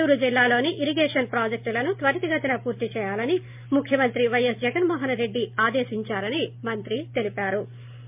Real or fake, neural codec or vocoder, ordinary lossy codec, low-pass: real; none; MP3, 32 kbps; 3.6 kHz